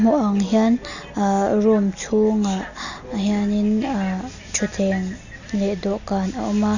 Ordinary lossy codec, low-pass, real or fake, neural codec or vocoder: none; 7.2 kHz; real; none